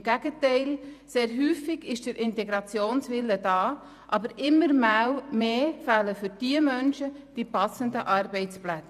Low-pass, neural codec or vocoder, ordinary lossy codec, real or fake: 14.4 kHz; vocoder, 48 kHz, 128 mel bands, Vocos; none; fake